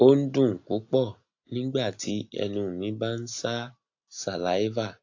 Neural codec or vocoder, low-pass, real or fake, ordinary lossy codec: none; 7.2 kHz; real; AAC, 48 kbps